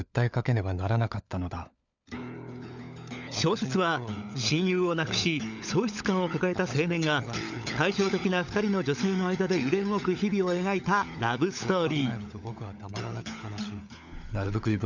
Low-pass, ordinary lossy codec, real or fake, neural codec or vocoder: 7.2 kHz; none; fake; codec, 16 kHz, 16 kbps, FunCodec, trained on LibriTTS, 50 frames a second